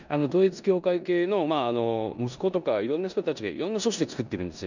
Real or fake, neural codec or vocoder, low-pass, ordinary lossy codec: fake; codec, 16 kHz in and 24 kHz out, 0.9 kbps, LongCat-Audio-Codec, four codebook decoder; 7.2 kHz; none